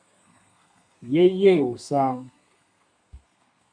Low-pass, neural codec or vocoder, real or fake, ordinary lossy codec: 9.9 kHz; codec, 32 kHz, 1.9 kbps, SNAC; fake; MP3, 96 kbps